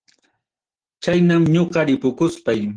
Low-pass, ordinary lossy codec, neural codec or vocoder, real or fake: 9.9 kHz; Opus, 16 kbps; none; real